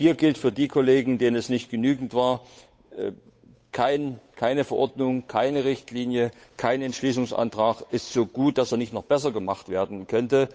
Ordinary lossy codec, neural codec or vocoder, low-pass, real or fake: none; codec, 16 kHz, 8 kbps, FunCodec, trained on Chinese and English, 25 frames a second; none; fake